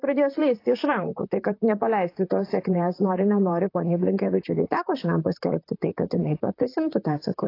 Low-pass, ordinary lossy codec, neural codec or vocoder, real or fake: 5.4 kHz; AAC, 32 kbps; vocoder, 44.1 kHz, 128 mel bands, Pupu-Vocoder; fake